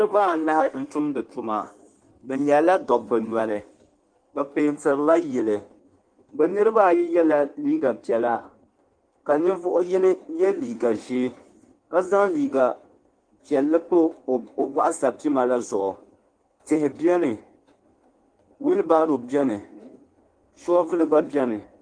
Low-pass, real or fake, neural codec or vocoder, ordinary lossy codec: 9.9 kHz; fake; codec, 16 kHz in and 24 kHz out, 1.1 kbps, FireRedTTS-2 codec; Opus, 24 kbps